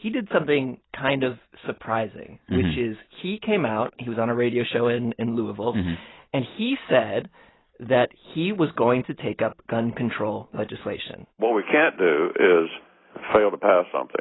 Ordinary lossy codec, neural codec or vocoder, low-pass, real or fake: AAC, 16 kbps; none; 7.2 kHz; real